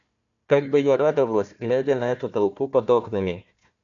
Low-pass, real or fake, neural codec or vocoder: 7.2 kHz; fake; codec, 16 kHz, 1 kbps, FunCodec, trained on Chinese and English, 50 frames a second